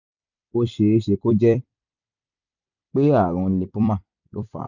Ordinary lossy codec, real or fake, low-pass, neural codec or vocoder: none; fake; 7.2 kHz; vocoder, 44.1 kHz, 128 mel bands every 256 samples, BigVGAN v2